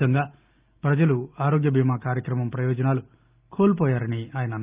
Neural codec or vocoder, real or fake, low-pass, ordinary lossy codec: none; real; 3.6 kHz; Opus, 24 kbps